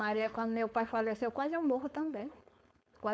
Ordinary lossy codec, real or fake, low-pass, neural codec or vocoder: none; fake; none; codec, 16 kHz, 4.8 kbps, FACodec